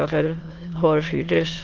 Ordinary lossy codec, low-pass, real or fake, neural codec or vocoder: Opus, 16 kbps; 7.2 kHz; fake; autoencoder, 22.05 kHz, a latent of 192 numbers a frame, VITS, trained on many speakers